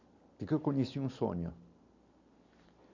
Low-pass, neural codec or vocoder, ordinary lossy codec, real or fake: 7.2 kHz; none; none; real